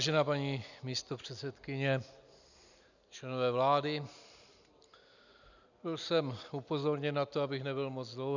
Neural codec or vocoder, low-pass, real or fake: none; 7.2 kHz; real